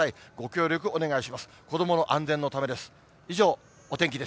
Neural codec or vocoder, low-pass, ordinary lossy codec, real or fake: none; none; none; real